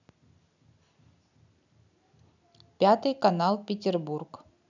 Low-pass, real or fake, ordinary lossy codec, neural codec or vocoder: 7.2 kHz; real; none; none